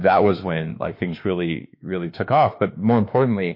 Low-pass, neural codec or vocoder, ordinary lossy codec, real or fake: 5.4 kHz; autoencoder, 48 kHz, 32 numbers a frame, DAC-VAE, trained on Japanese speech; MP3, 32 kbps; fake